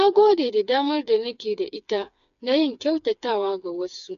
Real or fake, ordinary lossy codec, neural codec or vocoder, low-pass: fake; MP3, 96 kbps; codec, 16 kHz, 4 kbps, FreqCodec, smaller model; 7.2 kHz